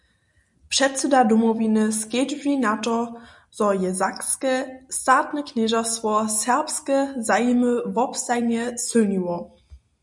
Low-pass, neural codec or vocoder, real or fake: 10.8 kHz; none; real